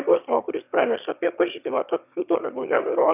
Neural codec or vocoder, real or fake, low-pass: autoencoder, 22.05 kHz, a latent of 192 numbers a frame, VITS, trained on one speaker; fake; 3.6 kHz